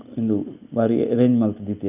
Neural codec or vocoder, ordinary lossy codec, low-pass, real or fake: none; none; 3.6 kHz; real